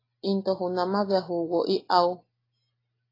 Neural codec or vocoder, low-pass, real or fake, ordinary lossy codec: none; 5.4 kHz; real; AAC, 24 kbps